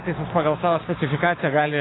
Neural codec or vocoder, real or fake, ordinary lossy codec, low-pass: codec, 16 kHz, 6 kbps, DAC; fake; AAC, 16 kbps; 7.2 kHz